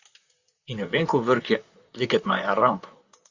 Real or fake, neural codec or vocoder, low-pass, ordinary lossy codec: fake; vocoder, 44.1 kHz, 128 mel bands, Pupu-Vocoder; 7.2 kHz; Opus, 64 kbps